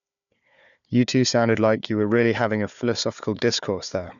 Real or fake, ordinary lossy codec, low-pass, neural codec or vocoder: fake; none; 7.2 kHz; codec, 16 kHz, 4 kbps, FunCodec, trained on Chinese and English, 50 frames a second